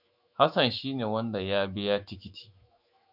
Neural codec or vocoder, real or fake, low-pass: autoencoder, 48 kHz, 128 numbers a frame, DAC-VAE, trained on Japanese speech; fake; 5.4 kHz